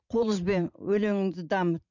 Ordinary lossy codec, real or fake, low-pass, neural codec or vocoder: none; fake; 7.2 kHz; vocoder, 44.1 kHz, 80 mel bands, Vocos